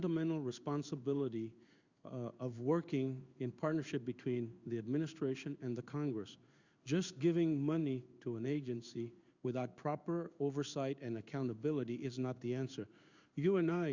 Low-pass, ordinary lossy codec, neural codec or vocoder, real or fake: 7.2 kHz; Opus, 64 kbps; codec, 16 kHz in and 24 kHz out, 1 kbps, XY-Tokenizer; fake